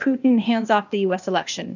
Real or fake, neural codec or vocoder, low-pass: fake; codec, 16 kHz, 0.8 kbps, ZipCodec; 7.2 kHz